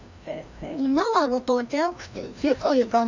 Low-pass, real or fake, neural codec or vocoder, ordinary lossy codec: 7.2 kHz; fake; codec, 16 kHz, 1 kbps, FreqCodec, larger model; none